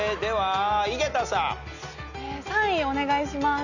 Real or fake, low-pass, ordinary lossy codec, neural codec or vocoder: real; 7.2 kHz; none; none